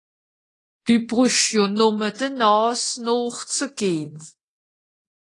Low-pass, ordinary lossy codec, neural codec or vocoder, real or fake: 10.8 kHz; AAC, 32 kbps; codec, 24 kHz, 0.9 kbps, DualCodec; fake